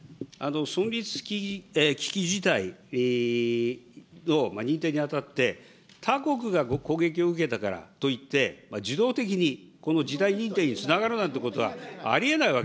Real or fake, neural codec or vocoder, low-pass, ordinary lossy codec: real; none; none; none